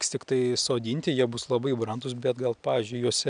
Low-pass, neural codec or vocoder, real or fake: 9.9 kHz; none; real